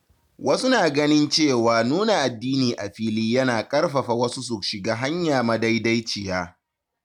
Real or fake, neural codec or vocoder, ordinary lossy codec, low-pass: real; none; none; 19.8 kHz